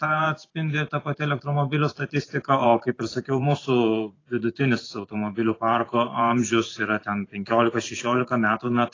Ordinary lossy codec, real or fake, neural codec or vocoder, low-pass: AAC, 32 kbps; fake; vocoder, 44.1 kHz, 128 mel bands every 512 samples, BigVGAN v2; 7.2 kHz